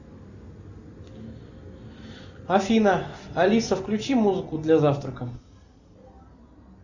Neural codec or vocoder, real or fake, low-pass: none; real; 7.2 kHz